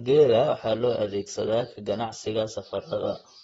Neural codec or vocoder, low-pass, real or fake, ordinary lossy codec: vocoder, 44.1 kHz, 128 mel bands, Pupu-Vocoder; 19.8 kHz; fake; AAC, 24 kbps